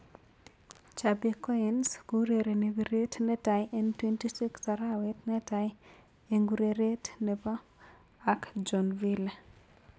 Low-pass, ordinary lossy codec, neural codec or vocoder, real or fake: none; none; none; real